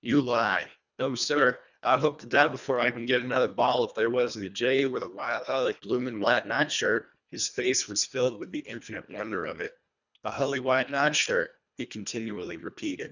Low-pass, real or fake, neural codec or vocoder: 7.2 kHz; fake; codec, 24 kHz, 1.5 kbps, HILCodec